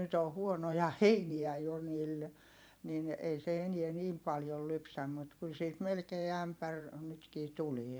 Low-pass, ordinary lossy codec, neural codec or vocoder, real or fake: none; none; vocoder, 44.1 kHz, 128 mel bands every 512 samples, BigVGAN v2; fake